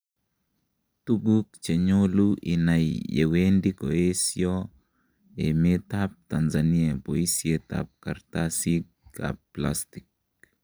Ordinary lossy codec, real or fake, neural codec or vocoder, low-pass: none; real; none; none